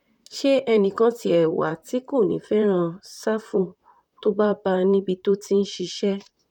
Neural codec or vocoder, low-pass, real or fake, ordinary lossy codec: vocoder, 44.1 kHz, 128 mel bands, Pupu-Vocoder; 19.8 kHz; fake; none